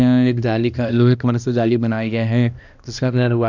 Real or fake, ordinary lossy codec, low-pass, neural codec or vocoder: fake; none; 7.2 kHz; codec, 16 kHz, 1 kbps, X-Codec, HuBERT features, trained on balanced general audio